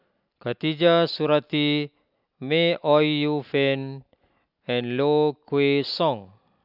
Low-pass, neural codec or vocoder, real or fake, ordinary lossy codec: 5.4 kHz; none; real; none